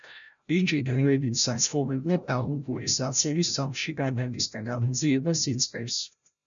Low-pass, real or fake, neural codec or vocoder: 7.2 kHz; fake; codec, 16 kHz, 0.5 kbps, FreqCodec, larger model